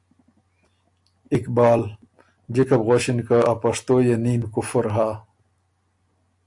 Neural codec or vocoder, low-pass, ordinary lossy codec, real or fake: none; 10.8 kHz; AAC, 64 kbps; real